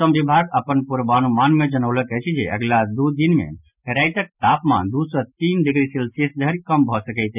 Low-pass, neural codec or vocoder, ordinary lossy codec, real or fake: 3.6 kHz; none; none; real